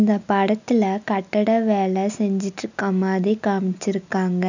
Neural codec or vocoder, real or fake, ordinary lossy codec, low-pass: none; real; none; 7.2 kHz